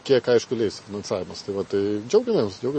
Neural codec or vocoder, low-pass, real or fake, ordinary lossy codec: none; 10.8 kHz; real; MP3, 32 kbps